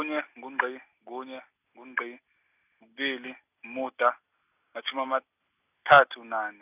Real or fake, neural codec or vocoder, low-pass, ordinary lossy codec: real; none; 3.6 kHz; none